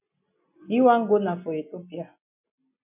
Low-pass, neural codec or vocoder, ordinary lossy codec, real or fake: 3.6 kHz; none; MP3, 32 kbps; real